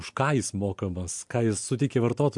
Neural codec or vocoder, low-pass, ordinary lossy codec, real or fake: none; 10.8 kHz; MP3, 64 kbps; real